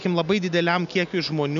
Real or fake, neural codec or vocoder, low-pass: real; none; 7.2 kHz